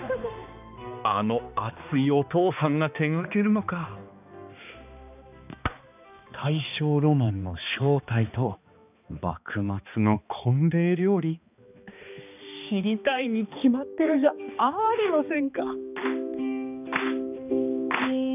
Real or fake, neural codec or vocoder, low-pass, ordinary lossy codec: fake; codec, 16 kHz, 2 kbps, X-Codec, HuBERT features, trained on balanced general audio; 3.6 kHz; none